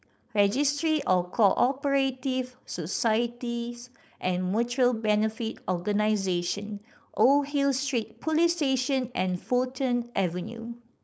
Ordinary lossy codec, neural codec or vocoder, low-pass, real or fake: none; codec, 16 kHz, 4.8 kbps, FACodec; none; fake